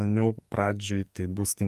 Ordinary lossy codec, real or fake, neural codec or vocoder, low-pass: Opus, 32 kbps; fake; codec, 44.1 kHz, 2.6 kbps, SNAC; 14.4 kHz